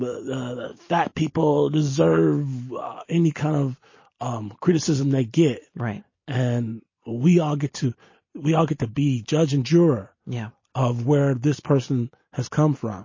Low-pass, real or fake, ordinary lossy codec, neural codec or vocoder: 7.2 kHz; real; MP3, 32 kbps; none